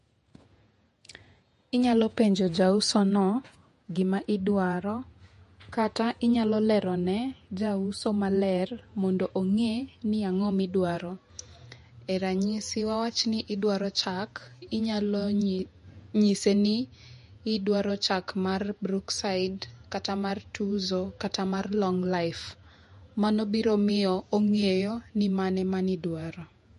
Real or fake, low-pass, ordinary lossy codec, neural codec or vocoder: fake; 14.4 kHz; MP3, 48 kbps; vocoder, 48 kHz, 128 mel bands, Vocos